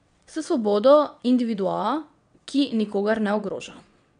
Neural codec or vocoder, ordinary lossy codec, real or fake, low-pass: vocoder, 22.05 kHz, 80 mel bands, WaveNeXt; none; fake; 9.9 kHz